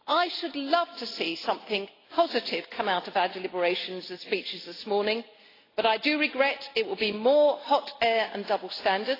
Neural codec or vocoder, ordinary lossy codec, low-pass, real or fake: none; AAC, 24 kbps; 5.4 kHz; real